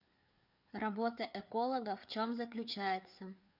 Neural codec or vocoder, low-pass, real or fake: codec, 16 kHz, 4 kbps, FreqCodec, larger model; 5.4 kHz; fake